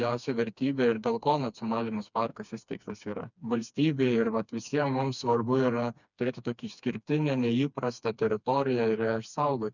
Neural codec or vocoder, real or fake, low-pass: codec, 16 kHz, 2 kbps, FreqCodec, smaller model; fake; 7.2 kHz